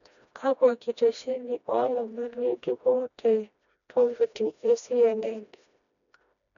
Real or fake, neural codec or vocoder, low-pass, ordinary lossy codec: fake; codec, 16 kHz, 1 kbps, FreqCodec, smaller model; 7.2 kHz; none